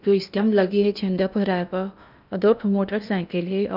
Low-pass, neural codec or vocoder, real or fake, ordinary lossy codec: 5.4 kHz; codec, 16 kHz in and 24 kHz out, 0.8 kbps, FocalCodec, streaming, 65536 codes; fake; none